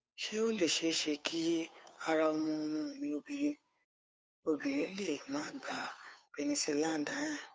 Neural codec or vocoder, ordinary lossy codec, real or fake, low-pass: codec, 16 kHz, 2 kbps, FunCodec, trained on Chinese and English, 25 frames a second; none; fake; none